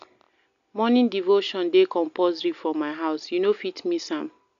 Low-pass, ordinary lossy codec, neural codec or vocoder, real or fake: 7.2 kHz; none; none; real